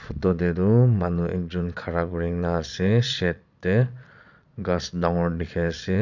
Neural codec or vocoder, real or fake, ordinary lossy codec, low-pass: vocoder, 22.05 kHz, 80 mel bands, Vocos; fake; none; 7.2 kHz